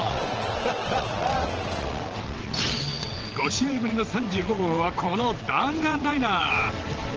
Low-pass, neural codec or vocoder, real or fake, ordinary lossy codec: 7.2 kHz; vocoder, 22.05 kHz, 80 mel bands, WaveNeXt; fake; Opus, 16 kbps